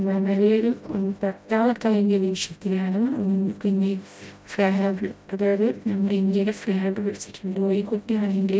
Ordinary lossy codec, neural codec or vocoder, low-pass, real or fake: none; codec, 16 kHz, 0.5 kbps, FreqCodec, smaller model; none; fake